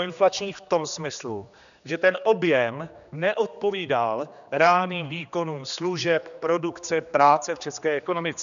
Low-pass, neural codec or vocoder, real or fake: 7.2 kHz; codec, 16 kHz, 2 kbps, X-Codec, HuBERT features, trained on general audio; fake